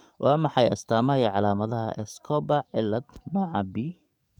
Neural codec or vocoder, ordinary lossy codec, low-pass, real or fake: codec, 44.1 kHz, 7.8 kbps, DAC; none; 19.8 kHz; fake